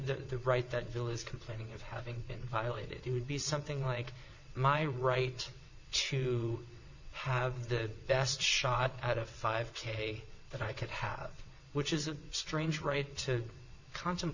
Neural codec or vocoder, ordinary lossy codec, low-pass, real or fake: vocoder, 22.05 kHz, 80 mel bands, WaveNeXt; Opus, 64 kbps; 7.2 kHz; fake